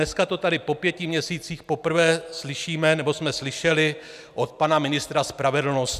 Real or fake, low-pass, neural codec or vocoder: real; 14.4 kHz; none